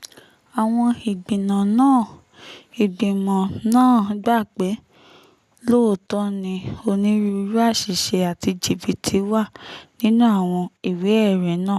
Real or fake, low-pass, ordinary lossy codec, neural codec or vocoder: real; 14.4 kHz; none; none